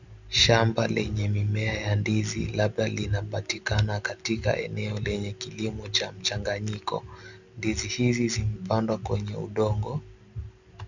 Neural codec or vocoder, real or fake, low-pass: none; real; 7.2 kHz